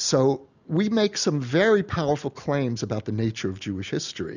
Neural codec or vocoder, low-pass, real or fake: none; 7.2 kHz; real